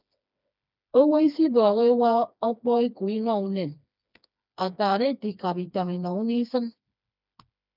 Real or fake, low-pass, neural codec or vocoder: fake; 5.4 kHz; codec, 16 kHz, 2 kbps, FreqCodec, smaller model